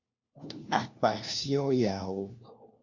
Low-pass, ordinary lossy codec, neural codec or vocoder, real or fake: 7.2 kHz; Opus, 64 kbps; codec, 16 kHz, 1 kbps, FunCodec, trained on LibriTTS, 50 frames a second; fake